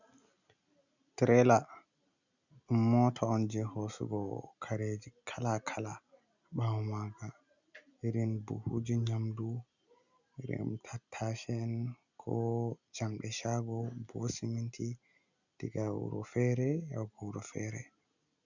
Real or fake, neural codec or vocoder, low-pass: real; none; 7.2 kHz